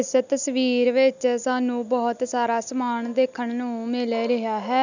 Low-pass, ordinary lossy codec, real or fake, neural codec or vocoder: 7.2 kHz; none; real; none